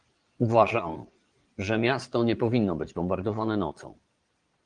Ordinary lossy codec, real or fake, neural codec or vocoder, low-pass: Opus, 24 kbps; fake; vocoder, 22.05 kHz, 80 mel bands, Vocos; 9.9 kHz